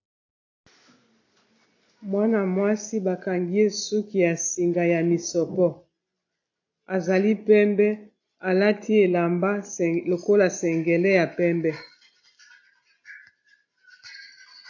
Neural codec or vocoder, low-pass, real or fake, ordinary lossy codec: none; 7.2 kHz; real; AAC, 48 kbps